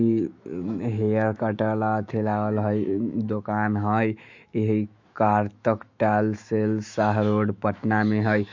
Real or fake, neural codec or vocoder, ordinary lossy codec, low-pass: fake; autoencoder, 48 kHz, 128 numbers a frame, DAC-VAE, trained on Japanese speech; MP3, 48 kbps; 7.2 kHz